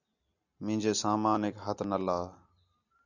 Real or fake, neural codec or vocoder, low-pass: real; none; 7.2 kHz